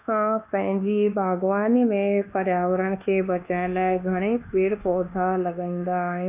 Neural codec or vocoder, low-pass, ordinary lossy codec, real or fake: codec, 24 kHz, 1.2 kbps, DualCodec; 3.6 kHz; none; fake